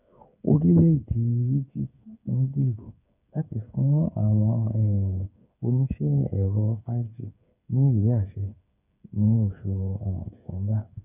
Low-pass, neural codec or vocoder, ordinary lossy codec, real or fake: 3.6 kHz; codec, 16 kHz, 8 kbps, FreqCodec, smaller model; none; fake